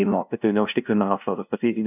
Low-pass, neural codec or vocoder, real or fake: 3.6 kHz; codec, 16 kHz, 0.5 kbps, FunCodec, trained on LibriTTS, 25 frames a second; fake